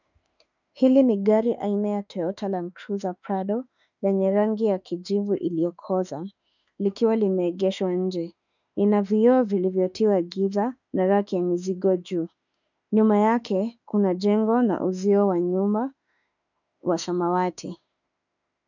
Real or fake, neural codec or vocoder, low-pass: fake; autoencoder, 48 kHz, 32 numbers a frame, DAC-VAE, trained on Japanese speech; 7.2 kHz